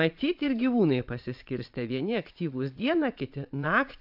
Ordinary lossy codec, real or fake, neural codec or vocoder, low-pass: AAC, 48 kbps; fake; vocoder, 22.05 kHz, 80 mel bands, Vocos; 5.4 kHz